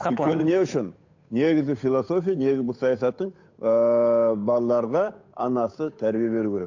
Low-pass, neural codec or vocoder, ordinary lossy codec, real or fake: 7.2 kHz; codec, 16 kHz, 8 kbps, FunCodec, trained on Chinese and English, 25 frames a second; AAC, 48 kbps; fake